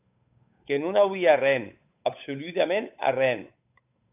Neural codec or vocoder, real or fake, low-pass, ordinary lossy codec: codec, 16 kHz, 8 kbps, FunCodec, trained on Chinese and English, 25 frames a second; fake; 3.6 kHz; AAC, 32 kbps